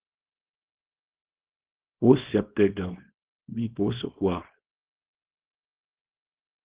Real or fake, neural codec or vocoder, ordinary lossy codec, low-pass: fake; codec, 24 kHz, 0.9 kbps, WavTokenizer, small release; Opus, 16 kbps; 3.6 kHz